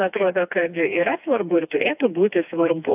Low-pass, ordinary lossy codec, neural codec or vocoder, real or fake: 3.6 kHz; AAC, 32 kbps; codec, 16 kHz, 2 kbps, FreqCodec, smaller model; fake